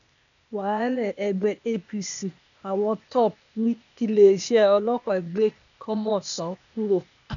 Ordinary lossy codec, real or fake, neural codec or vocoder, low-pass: none; fake; codec, 16 kHz, 0.8 kbps, ZipCodec; 7.2 kHz